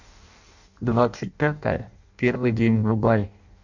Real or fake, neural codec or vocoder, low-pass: fake; codec, 16 kHz in and 24 kHz out, 0.6 kbps, FireRedTTS-2 codec; 7.2 kHz